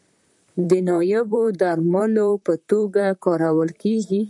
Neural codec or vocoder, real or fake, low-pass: vocoder, 44.1 kHz, 128 mel bands, Pupu-Vocoder; fake; 10.8 kHz